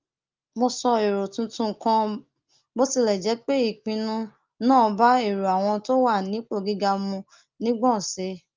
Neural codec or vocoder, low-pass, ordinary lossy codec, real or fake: none; 7.2 kHz; Opus, 24 kbps; real